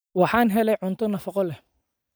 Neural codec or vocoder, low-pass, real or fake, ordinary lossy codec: none; none; real; none